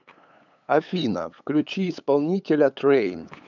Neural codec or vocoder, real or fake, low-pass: codec, 16 kHz, 8 kbps, FunCodec, trained on LibriTTS, 25 frames a second; fake; 7.2 kHz